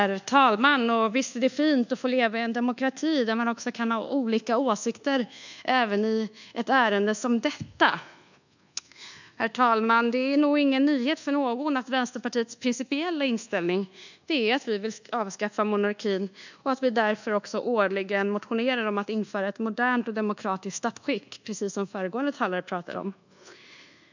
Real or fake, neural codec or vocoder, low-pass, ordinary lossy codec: fake; codec, 24 kHz, 1.2 kbps, DualCodec; 7.2 kHz; none